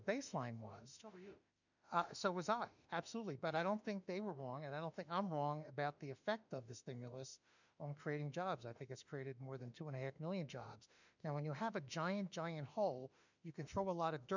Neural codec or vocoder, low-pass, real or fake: autoencoder, 48 kHz, 32 numbers a frame, DAC-VAE, trained on Japanese speech; 7.2 kHz; fake